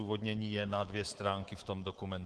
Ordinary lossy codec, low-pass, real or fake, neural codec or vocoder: Opus, 16 kbps; 10.8 kHz; fake; codec, 24 kHz, 3.1 kbps, DualCodec